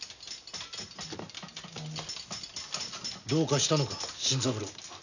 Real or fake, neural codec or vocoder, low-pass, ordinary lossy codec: real; none; 7.2 kHz; none